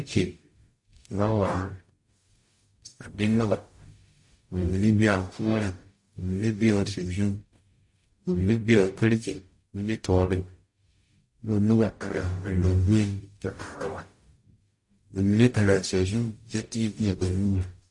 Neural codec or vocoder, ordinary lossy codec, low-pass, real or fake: codec, 44.1 kHz, 0.9 kbps, DAC; MP3, 48 kbps; 10.8 kHz; fake